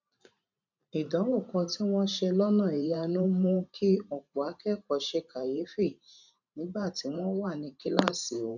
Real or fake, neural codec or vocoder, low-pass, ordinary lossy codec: fake; vocoder, 44.1 kHz, 128 mel bands every 512 samples, BigVGAN v2; 7.2 kHz; none